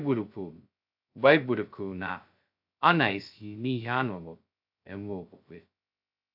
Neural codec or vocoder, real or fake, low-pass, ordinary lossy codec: codec, 16 kHz, 0.2 kbps, FocalCodec; fake; 5.4 kHz; AAC, 48 kbps